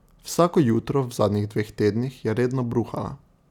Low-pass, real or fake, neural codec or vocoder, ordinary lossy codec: 19.8 kHz; real; none; none